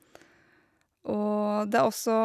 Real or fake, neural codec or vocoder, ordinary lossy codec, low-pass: real; none; none; 14.4 kHz